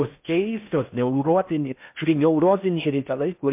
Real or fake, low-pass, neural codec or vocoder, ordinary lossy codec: fake; 3.6 kHz; codec, 16 kHz in and 24 kHz out, 0.6 kbps, FocalCodec, streaming, 4096 codes; MP3, 32 kbps